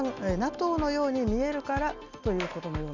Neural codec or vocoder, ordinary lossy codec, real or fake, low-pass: none; none; real; 7.2 kHz